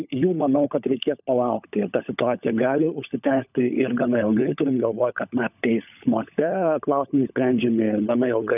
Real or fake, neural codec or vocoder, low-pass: fake; codec, 16 kHz, 16 kbps, FunCodec, trained on LibriTTS, 50 frames a second; 3.6 kHz